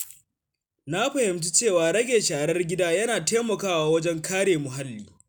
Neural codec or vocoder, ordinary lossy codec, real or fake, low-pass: none; none; real; none